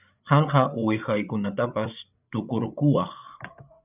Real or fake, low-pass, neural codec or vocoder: fake; 3.6 kHz; codec, 16 kHz, 8 kbps, FreqCodec, larger model